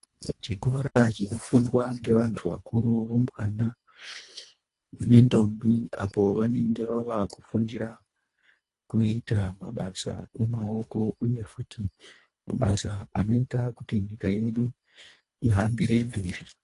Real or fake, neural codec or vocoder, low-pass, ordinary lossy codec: fake; codec, 24 kHz, 1.5 kbps, HILCodec; 10.8 kHz; AAC, 48 kbps